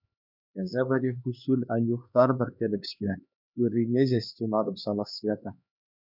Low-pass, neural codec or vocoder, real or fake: 5.4 kHz; codec, 16 kHz, 2 kbps, X-Codec, HuBERT features, trained on LibriSpeech; fake